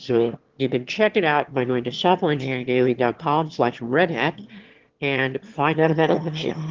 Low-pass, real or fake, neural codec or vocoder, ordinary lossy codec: 7.2 kHz; fake; autoencoder, 22.05 kHz, a latent of 192 numbers a frame, VITS, trained on one speaker; Opus, 16 kbps